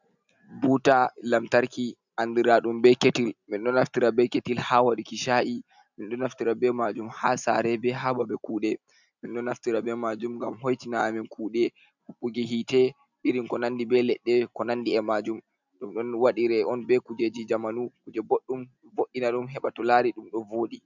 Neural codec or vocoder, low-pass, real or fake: none; 7.2 kHz; real